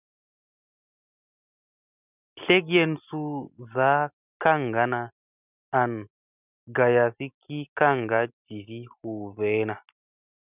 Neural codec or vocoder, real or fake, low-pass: none; real; 3.6 kHz